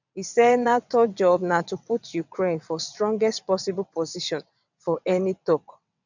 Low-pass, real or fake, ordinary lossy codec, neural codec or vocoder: 7.2 kHz; fake; none; vocoder, 22.05 kHz, 80 mel bands, WaveNeXt